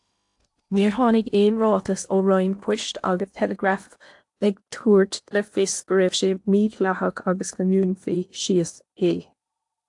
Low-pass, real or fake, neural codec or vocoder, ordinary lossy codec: 10.8 kHz; fake; codec, 16 kHz in and 24 kHz out, 0.8 kbps, FocalCodec, streaming, 65536 codes; AAC, 64 kbps